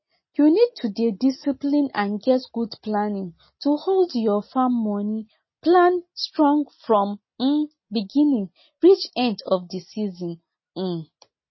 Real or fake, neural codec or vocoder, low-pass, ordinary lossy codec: real; none; 7.2 kHz; MP3, 24 kbps